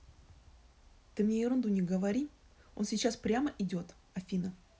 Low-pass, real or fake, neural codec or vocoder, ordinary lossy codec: none; real; none; none